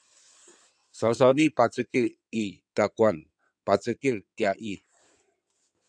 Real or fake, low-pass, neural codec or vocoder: fake; 9.9 kHz; codec, 16 kHz in and 24 kHz out, 2.2 kbps, FireRedTTS-2 codec